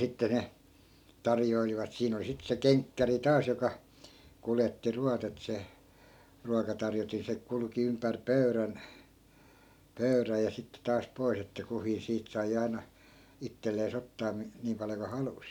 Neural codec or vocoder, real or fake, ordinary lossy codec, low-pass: none; real; none; 19.8 kHz